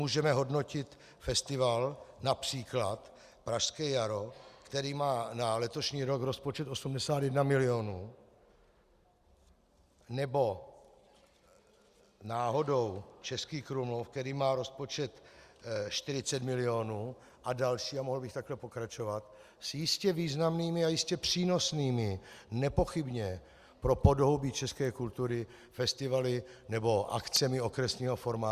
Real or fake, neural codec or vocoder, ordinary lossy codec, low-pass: real; none; Opus, 64 kbps; 14.4 kHz